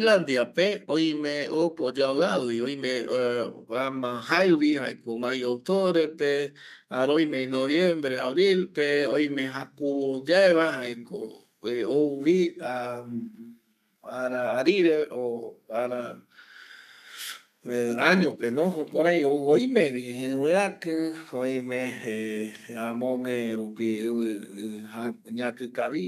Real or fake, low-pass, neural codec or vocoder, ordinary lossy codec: fake; 14.4 kHz; codec, 32 kHz, 1.9 kbps, SNAC; none